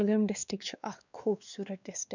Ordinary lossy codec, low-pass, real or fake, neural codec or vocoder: none; 7.2 kHz; fake; codec, 16 kHz, 2 kbps, X-Codec, WavLM features, trained on Multilingual LibriSpeech